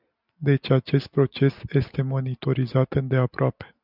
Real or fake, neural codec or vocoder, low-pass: real; none; 5.4 kHz